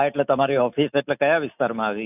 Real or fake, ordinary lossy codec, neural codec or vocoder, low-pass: real; none; none; 3.6 kHz